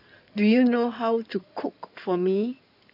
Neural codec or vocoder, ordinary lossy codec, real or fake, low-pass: codec, 16 kHz in and 24 kHz out, 2.2 kbps, FireRedTTS-2 codec; none; fake; 5.4 kHz